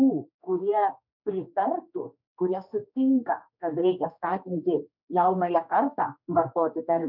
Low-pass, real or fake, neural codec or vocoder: 5.4 kHz; fake; codec, 16 kHz, 2 kbps, X-Codec, HuBERT features, trained on general audio